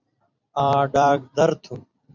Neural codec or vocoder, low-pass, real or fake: vocoder, 44.1 kHz, 128 mel bands every 256 samples, BigVGAN v2; 7.2 kHz; fake